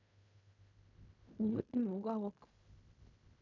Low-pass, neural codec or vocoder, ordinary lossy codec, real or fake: 7.2 kHz; codec, 16 kHz in and 24 kHz out, 0.4 kbps, LongCat-Audio-Codec, fine tuned four codebook decoder; none; fake